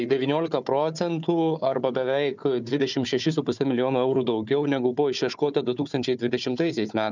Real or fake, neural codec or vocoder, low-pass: fake; codec, 16 kHz, 4 kbps, FunCodec, trained on Chinese and English, 50 frames a second; 7.2 kHz